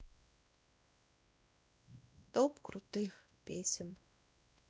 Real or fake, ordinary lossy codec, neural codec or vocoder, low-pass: fake; none; codec, 16 kHz, 1 kbps, X-Codec, WavLM features, trained on Multilingual LibriSpeech; none